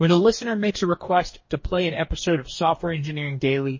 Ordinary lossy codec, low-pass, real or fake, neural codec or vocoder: MP3, 32 kbps; 7.2 kHz; fake; codec, 44.1 kHz, 2.6 kbps, DAC